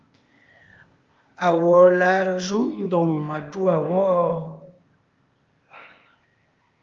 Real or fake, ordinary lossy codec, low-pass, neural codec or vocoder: fake; Opus, 32 kbps; 7.2 kHz; codec, 16 kHz, 0.8 kbps, ZipCodec